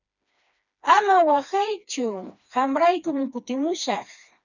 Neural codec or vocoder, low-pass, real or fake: codec, 16 kHz, 2 kbps, FreqCodec, smaller model; 7.2 kHz; fake